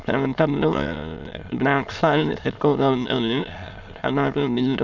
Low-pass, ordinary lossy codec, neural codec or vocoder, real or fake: 7.2 kHz; none; autoencoder, 22.05 kHz, a latent of 192 numbers a frame, VITS, trained on many speakers; fake